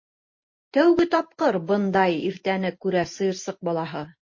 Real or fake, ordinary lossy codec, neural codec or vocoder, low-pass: real; MP3, 32 kbps; none; 7.2 kHz